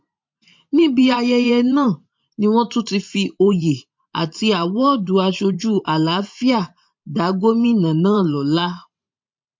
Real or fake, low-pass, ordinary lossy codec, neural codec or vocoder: fake; 7.2 kHz; MP3, 48 kbps; vocoder, 44.1 kHz, 128 mel bands every 512 samples, BigVGAN v2